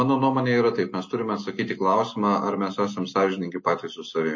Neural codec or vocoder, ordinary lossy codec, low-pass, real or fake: none; MP3, 32 kbps; 7.2 kHz; real